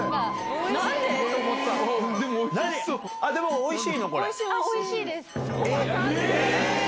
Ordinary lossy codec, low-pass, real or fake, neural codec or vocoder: none; none; real; none